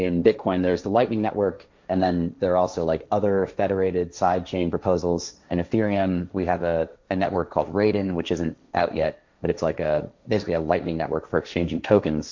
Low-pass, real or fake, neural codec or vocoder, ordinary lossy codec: 7.2 kHz; fake; codec, 16 kHz, 1.1 kbps, Voila-Tokenizer; MP3, 64 kbps